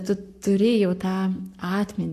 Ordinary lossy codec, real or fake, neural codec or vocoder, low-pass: AAC, 64 kbps; fake; codec, 44.1 kHz, 7.8 kbps, Pupu-Codec; 14.4 kHz